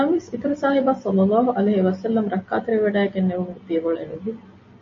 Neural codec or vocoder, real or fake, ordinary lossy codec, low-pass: none; real; MP3, 32 kbps; 7.2 kHz